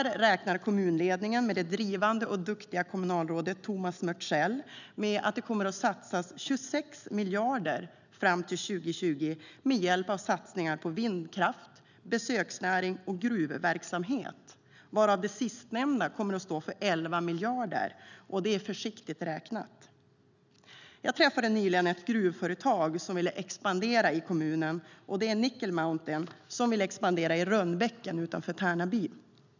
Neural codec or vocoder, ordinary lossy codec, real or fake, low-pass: none; none; real; 7.2 kHz